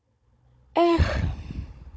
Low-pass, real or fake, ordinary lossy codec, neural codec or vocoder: none; fake; none; codec, 16 kHz, 16 kbps, FunCodec, trained on Chinese and English, 50 frames a second